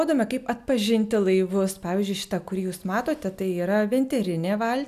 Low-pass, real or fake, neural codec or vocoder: 14.4 kHz; real; none